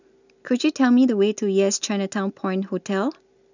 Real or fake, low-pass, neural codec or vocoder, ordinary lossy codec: real; 7.2 kHz; none; none